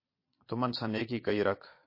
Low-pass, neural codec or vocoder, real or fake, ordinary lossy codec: 5.4 kHz; vocoder, 22.05 kHz, 80 mel bands, Vocos; fake; MP3, 32 kbps